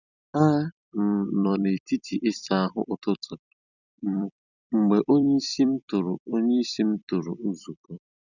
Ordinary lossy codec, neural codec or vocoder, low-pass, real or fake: none; vocoder, 44.1 kHz, 128 mel bands every 256 samples, BigVGAN v2; 7.2 kHz; fake